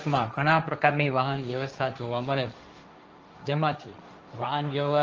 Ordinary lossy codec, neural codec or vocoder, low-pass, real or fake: Opus, 24 kbps; codec, 16 kHz, 1.1 kbps, Voila-Tokenizer; 7.2 kHz; fake